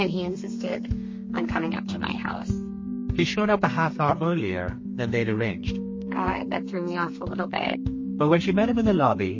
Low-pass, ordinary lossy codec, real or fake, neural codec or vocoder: 7.2 kHz; MP3, 32 kbps; fake; codec, 32 kHz, 1.9 kbps, SNAC